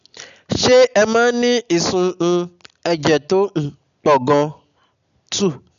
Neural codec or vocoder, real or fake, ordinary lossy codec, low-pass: none; real; none; 7.2 kHz